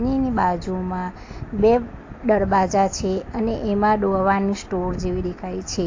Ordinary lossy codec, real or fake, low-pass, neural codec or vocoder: AAC, 48 kbps; real; 7.2 kHz; none